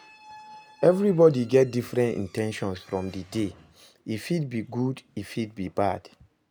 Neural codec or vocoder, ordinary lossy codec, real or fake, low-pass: none; none; real; none